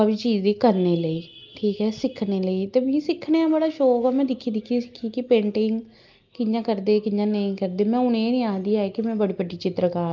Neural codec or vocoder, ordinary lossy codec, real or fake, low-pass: none; Opus, 32 kbps; real; 7.2 kHz